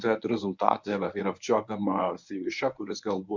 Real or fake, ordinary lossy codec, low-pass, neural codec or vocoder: fake; MP3, 64 kbps; 7.2 kHz; codec, 24 kHz, 0.9 kbps, WavTokenizer, medium speech release version 1